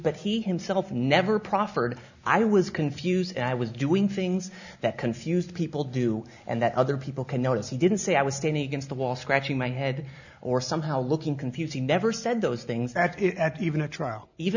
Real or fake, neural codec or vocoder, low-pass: real; none; 7.2 kHz